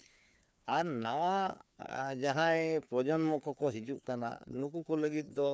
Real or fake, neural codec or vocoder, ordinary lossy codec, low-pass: fake; codec, 16 kHz, 2 kbps, FreqCodec, larger model; none; none